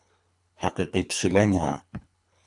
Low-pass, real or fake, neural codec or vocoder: 10.8 kHz; fake; codec, 24 kHz, 3 kbps, HILCodec